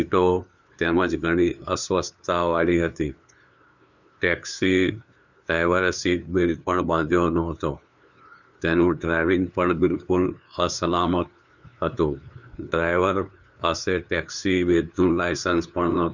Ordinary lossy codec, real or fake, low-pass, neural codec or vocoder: none; fake; 7.2 kHz; codec, 16 kHz, 2 kbps, FunCodec, trained on LibriTTS, 25 frames a second